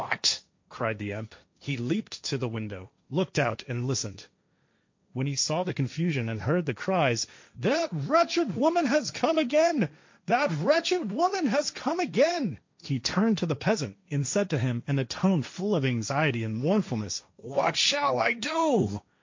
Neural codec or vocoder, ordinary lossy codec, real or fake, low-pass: codec, 16 kHz, 1.1 kbps, Voila-Tokenizer; MP3, 48 kbps; fake; 7.2 kHz